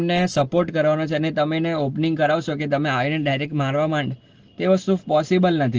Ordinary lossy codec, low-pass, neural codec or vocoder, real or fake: Opus, 16 kbps; 7.2 kHz; none; real